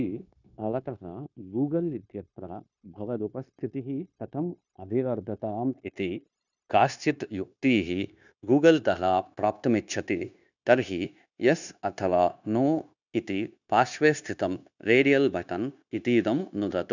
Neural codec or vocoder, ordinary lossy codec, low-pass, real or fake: codec, 16 kHz, 0.9 kbps, LongCat-Audio-Codec; none; 7.2 kHz; fake